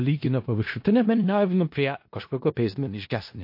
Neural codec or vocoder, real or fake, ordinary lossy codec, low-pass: codec, 16 kHz in and 24 kHz out, 0.4 kbps, LongCat-Audio-Codec, four codebook decoder; fake; MP3, 32 kbps; 5.4 kHz